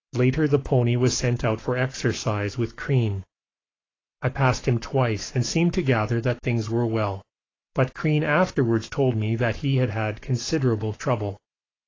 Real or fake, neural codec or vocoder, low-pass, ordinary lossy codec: fake; codec, 44.1 kHz, 7.8 kbps, Pupu-Codec; 7.2 kHz; AAC, 32 kbps